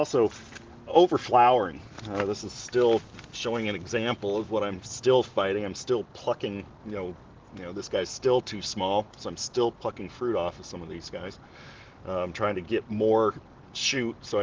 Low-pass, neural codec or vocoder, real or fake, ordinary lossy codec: 7.2 kHz; none; real; Opus, 16 kbps